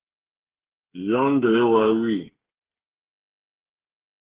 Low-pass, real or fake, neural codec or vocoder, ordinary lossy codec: 3.6 kHz; fake; codec, 16 kHz, 8 kbps, FreqCodec, smaller model; Opus, 16 kbps